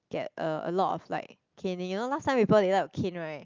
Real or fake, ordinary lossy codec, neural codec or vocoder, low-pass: real; Opus, 24 kbps; none; 7.2 kHz